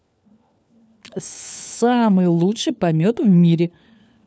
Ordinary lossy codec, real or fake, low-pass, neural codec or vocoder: none; fake; none; codec, 16 kHz, 4 kbps, FunCodec, trained on LibriTTS, 50 frames a second